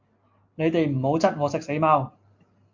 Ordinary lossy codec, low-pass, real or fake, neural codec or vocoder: MP3, 48 kbps; 7.2 kHz; real; none